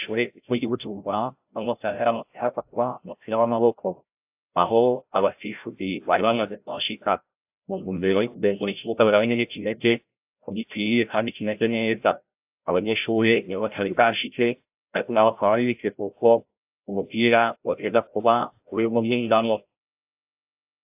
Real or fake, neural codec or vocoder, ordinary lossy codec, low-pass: fake; codec, 16 kHz, 0.5 kbps, FreqCodec, larger model; AAC, 32 kbps; 3.6 kHz